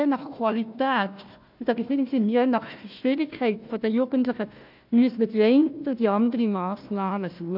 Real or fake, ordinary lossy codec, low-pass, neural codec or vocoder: fake; none; 5.4 kHz; codec, 16 kHz, 1 kbps, FunCodec, trained on Chinese and English, 50 frames a second